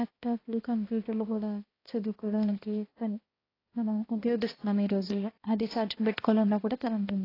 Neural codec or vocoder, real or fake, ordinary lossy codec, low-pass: codec, 16 kHz, 1 kbps, X-Codec, HuBERT features, trained on balanced general audio; fake; AAC, 24 kbps; 5.4 kHz